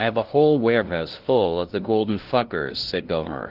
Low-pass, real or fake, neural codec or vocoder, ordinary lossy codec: 5.4 kHz; fake; codec, 16 kHz, 0.5 kbps, FunCodec, trained on LibriTTS, 25 frames a second; Opus, 16 kbps